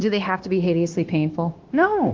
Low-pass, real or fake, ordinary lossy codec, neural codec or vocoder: 7.2 kHz; fake; Opus, 16 kbps; codec, 16 kHz, 0.9 kbps, LongCat-Audio-Codec